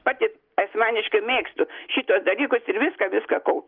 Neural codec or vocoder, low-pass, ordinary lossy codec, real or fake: none; 5.4 kHz; Opus, 32 kbps; real